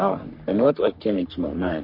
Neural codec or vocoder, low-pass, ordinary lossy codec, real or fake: codec, 44.1 kHz, 3.4 kbps, Pupu-Codec; 5.4 kHz; MP3, 32 kbps; fake